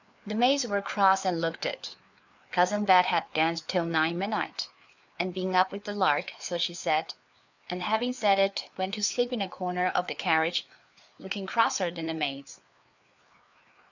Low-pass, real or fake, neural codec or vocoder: 7.2 kHz; fake; codec, 16 kHz, 4 kbps, FreqCodec, larger model